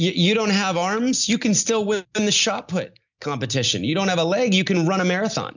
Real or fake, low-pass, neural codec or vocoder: real; 7.2 kHz; none